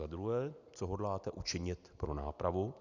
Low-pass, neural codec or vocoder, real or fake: 7.2 kHz; none; real